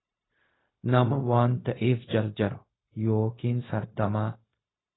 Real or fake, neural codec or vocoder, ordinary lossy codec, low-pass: fake; codec, 16 kHz, 0.4 kbps, LongCat-Audio-Codec; AAC, 16 kbps; 7.2 kHz